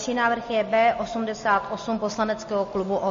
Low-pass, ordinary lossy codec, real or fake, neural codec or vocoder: 7.2 kHz; MP3, 32 kbps; real; none